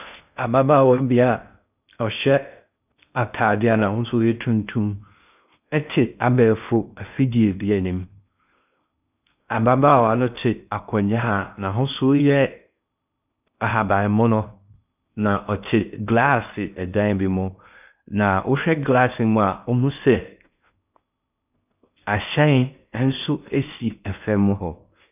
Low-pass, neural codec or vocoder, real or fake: 3.6 kHz; codec, 16 kHz in and 24 kHz out, 0.6 kbps, FocalCodec, streaming, 2048 codes; fake